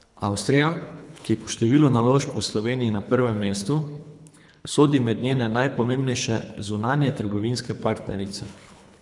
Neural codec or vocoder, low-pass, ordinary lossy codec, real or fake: codec, 24 kHz, 3 kbps, HILCodec; 10.8 kHz; none; fake